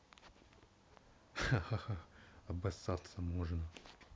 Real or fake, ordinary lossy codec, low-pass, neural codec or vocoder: real; none; none; none